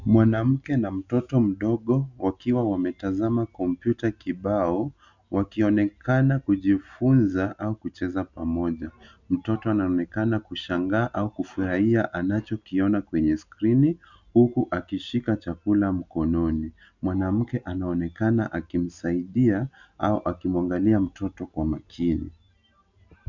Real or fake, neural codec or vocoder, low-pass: real; none; 7.2 kHz